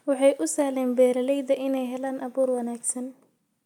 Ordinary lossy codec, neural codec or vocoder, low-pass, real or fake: none; none; 19.8 kHz; real